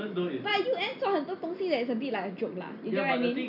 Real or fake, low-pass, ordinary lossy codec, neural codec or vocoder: real; 5.4 kHz; none; none